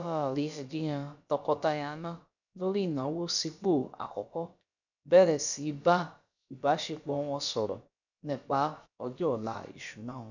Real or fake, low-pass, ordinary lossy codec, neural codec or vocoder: fake; 7.2 kHz; none; codec, 16 kHz, about 1 kbps, DyCAST, with the encoder's durations